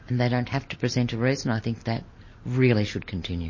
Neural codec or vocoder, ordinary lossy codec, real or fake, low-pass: none; MP3, 32 kbps; real; 7.2 kHz